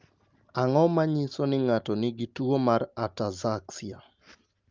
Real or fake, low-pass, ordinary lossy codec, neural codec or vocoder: real; 7.2 kHz; Opus, 32 kbps; none